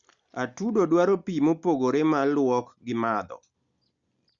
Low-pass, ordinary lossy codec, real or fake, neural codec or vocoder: 7.2 kHz; Opus, 64 kbps; real; none